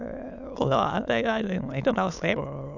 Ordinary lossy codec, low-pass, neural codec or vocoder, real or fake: none; 7.2 kHz; autoencoder, 22.05 kHz, a latent of 192 numbers a frame, VITS, trained on many speakers; fake